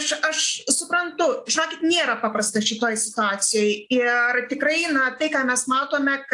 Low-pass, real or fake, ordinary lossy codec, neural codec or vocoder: 10.8 kHz; real; AAC, 64 kbps; none